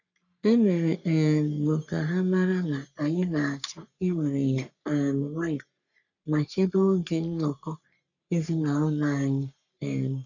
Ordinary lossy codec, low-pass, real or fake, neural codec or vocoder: none; 7.2 kHz; fake; codec, 44.1 kHz, 3.4 kbps, Pupu-Codec